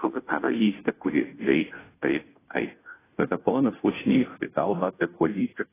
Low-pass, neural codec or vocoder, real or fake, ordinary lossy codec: 3.6 kHz; codec, 16 kHz, 0.5 kbps, FunCodec, trained on Chinese and English, 25 frames a second; fake; AAC, 16 kbps